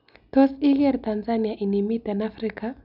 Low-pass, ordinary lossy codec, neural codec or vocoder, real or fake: 5.4 kHz; none; none; real